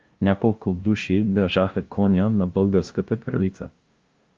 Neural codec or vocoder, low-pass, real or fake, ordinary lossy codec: codec, 16 kHz, 0.5 kbps, FunCodec, trained on LibriTTS, 25 frames a second; 7.2 kHz; fake; Opus, 32 kbps